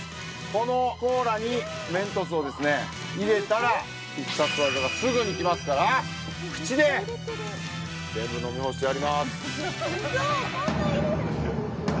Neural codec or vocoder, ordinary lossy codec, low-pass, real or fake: none; none; none; real